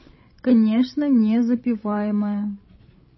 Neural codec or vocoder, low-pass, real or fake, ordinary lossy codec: codec, 16 kHz, 16 kbps, FreqCodec, larger model; 7.2 kHz; fake; MP3, 24 kbps